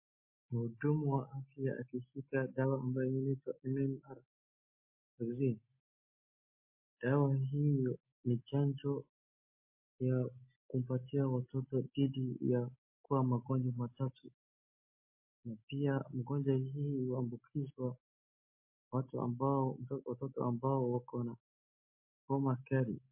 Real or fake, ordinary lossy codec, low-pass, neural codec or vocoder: real; MP3, 24 kbps; 3.6 kHz; none